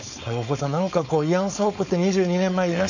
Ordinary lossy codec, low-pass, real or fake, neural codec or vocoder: none; 7.2 kHz; fake; codec, 16 kHz, 4.8 kbps, FACodec